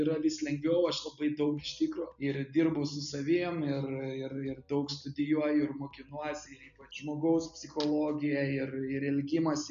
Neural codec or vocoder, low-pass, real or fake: none; 7.2 kHz; real